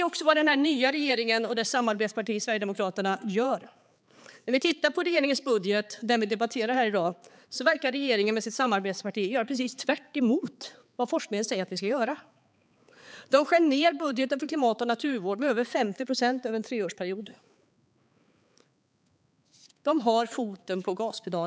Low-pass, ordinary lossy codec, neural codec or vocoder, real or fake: none; none; codec, 16 kHz, 4 kbps, X-Codec, HuBERT features, trained on balanced general audio; fake